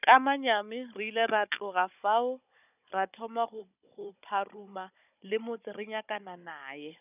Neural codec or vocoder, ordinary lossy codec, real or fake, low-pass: codec, 16 kHz, 16 kbps, FunCodec, trained on Chinese and English, 50 frames a second; none; fake; 3.6 kHz